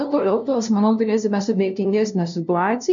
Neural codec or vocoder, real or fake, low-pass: codec, 16 kHz, 0.5 kbps, FunCodec, trained on LibriTTS, 25 frames a second; fake; 7.2 kHz